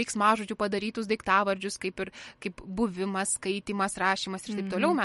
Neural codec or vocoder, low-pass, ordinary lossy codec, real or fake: none; 19.8 kHz; MP3, 48 kbps; real